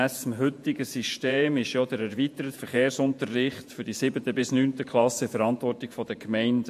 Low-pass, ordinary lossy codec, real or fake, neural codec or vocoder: 14.4 kHz; MP3, 64 kbps; fake; vocoder, 48 kHz, 128 mel bands, Vocos